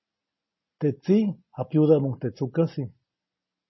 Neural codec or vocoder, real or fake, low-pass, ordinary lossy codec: none; real; 7.2 kHz; MP3, 24 kbps